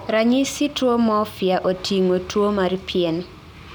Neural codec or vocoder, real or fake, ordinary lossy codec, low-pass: none; real; none; none